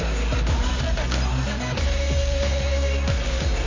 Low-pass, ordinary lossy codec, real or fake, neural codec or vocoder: 7.2 kHz; MP3, 32 kbps; fake; codec, 24 kHz, 0.9 kbps, WavTokenizer, medium music audio release